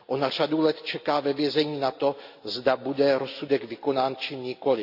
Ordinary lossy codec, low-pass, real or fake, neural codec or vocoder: AAC, 48 kbps; 5.4 kHz; real; none